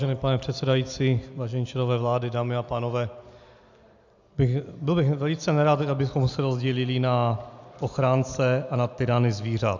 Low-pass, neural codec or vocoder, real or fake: 7.2 kHz; none; real